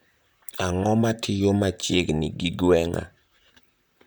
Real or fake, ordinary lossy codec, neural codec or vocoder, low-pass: fake; none; vocoder, 44.1 kHz, 128 mel bands, Pupu-Vocoder; none